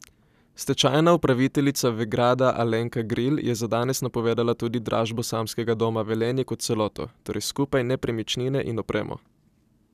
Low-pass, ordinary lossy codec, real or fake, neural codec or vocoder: 14.4 kHz; none; real; none